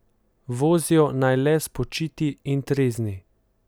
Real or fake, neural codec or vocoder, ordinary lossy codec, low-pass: real; none; none; none